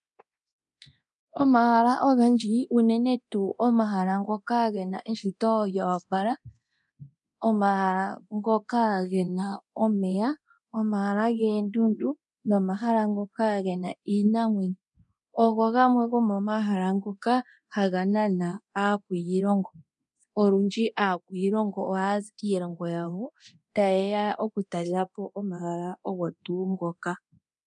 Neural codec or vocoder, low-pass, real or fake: codec, 24 kHz, 0.9 kbps, DualCodec; 10.8 kHz; fake